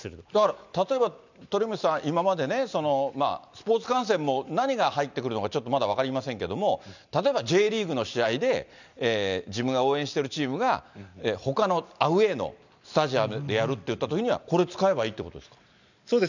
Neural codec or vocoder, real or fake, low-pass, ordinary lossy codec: none; real; 7.2 kHz; none